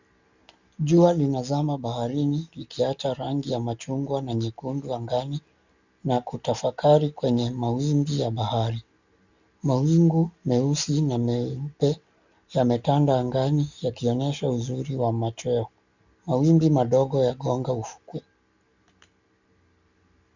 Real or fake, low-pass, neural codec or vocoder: real; 7.2 kHz; none